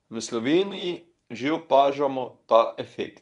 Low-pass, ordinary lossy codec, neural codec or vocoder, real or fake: 10.8 kHz; none; codec, 24 kHz, 0.9 kbps, WavTokenizer, medium speech release version 1; fake